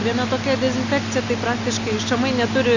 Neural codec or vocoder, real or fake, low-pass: none; real; 7.2 kHz